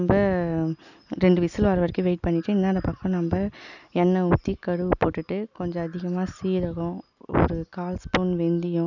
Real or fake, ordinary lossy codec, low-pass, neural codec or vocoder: real; none; 7.2 kHz; none